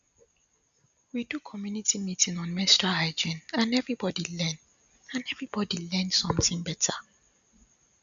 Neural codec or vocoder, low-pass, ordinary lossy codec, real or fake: none; 7.2 kHz; none; real